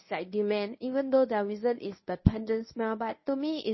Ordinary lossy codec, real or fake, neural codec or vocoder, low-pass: MP3, 24 kbps; fake; codec, 24 kHz, 0.9 kbps, WavTokenizer, medium speech release version 1; 7.2 kHz